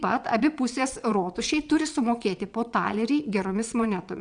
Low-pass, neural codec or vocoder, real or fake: 9.9 kHz; vocoder, 22.05 kHz, 80 mel bands, WaveNeXt; fake